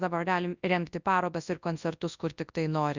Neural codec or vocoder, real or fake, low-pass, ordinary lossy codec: codec, 24 kHz, 0.9 kbps, WavTokenizer, large speech release; fake; 7.2 kHz; Opus, 64 kbps